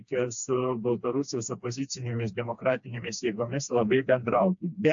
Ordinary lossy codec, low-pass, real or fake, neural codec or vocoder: AAC, 64 kbps; 7.2 kHz; fake; codec, 16 kHz, 2 kbps, FreqCodec, smaller model